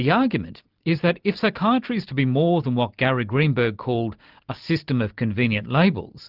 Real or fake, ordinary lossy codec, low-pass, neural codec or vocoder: real; Opus, 32 kbps; 5.4 kHz; none